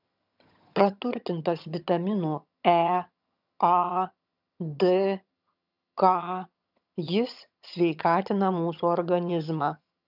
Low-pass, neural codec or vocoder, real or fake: 5.4 kHz; vocoder, 22.05 kHz, 80 mel bands, HiFi-GAN; fake